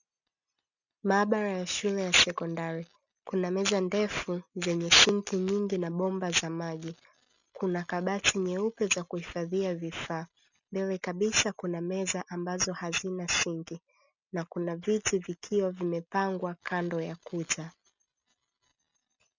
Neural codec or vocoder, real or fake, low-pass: none; real; 7.2 kHz